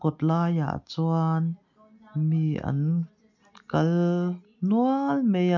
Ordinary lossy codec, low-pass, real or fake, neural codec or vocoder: none; 7.2 kHz; real; none